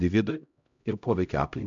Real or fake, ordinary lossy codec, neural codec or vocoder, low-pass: fake; MP3, 64 kbps; codec, 16 kHz, 0.5 kbps, X-Codec, HuBERT features, trained on LibriSpeech; 7.2 kHz